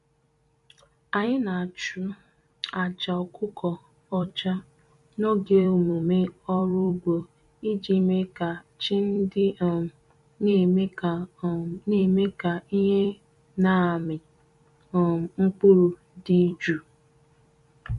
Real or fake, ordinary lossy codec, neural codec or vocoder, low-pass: fake; MP3, 48 kbps; vocoder, 44.1 kHz, 128 mel bands every 256 samples, BigVGAN v2; 14.4 kHz